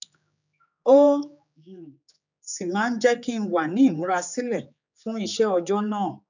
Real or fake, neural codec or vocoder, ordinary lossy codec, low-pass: fake; codec, 16 kHz, 4 kbps, X-Codec, HuBERT features, trained on general audio; none; 7.2 kHz